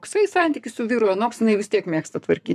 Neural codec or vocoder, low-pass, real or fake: codec, 44.1 kHz, 7.8 kbps, Pupu-Codec; 14.4 kHz; fake